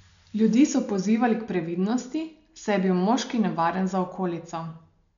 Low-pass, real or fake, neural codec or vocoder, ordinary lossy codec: 7.2 kHz; real; none; none